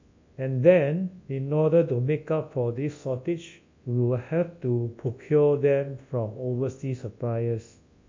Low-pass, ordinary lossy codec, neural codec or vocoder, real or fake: 7.2 kHz; MP3, 48 kbps; codec, 24 kHz, 0.9 kbps, WavTokenizer, large speech release; fake